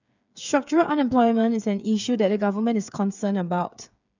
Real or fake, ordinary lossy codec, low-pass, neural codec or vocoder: fake; none; 7.2 kHz; codec, 16 kHz, 8 kbps, FreqCodec, smaller model